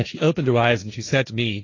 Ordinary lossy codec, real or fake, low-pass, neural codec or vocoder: AAC, 32 kbps; fake; 7.2 kHz; codec, 16 kHz, 1.1 kbps, Voila-Tokenizer